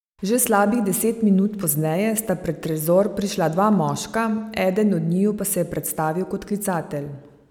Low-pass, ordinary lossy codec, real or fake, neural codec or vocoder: 19.8 kHz; none; real; none